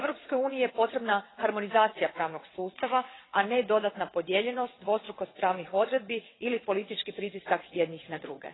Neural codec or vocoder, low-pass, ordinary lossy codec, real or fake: vocoder, 22.05 kHz, 80 mel bands, WaveNeXt; 7.2 kHz; AAC, 16 kbps; fake